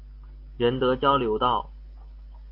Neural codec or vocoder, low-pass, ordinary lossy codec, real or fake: none; 5.4 kHz; AAC, 32 kbps; real